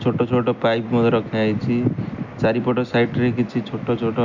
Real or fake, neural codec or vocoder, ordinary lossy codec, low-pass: real; none; MP3, 64 kbps; 7.2 kHz